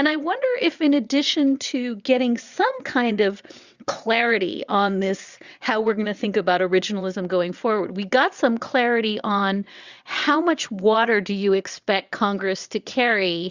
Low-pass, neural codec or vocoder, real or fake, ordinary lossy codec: 7.2 kHz; vocoder, 22.05 kHz, 80 mel bands, WaveNeXt; fake; Opus, 64 kbps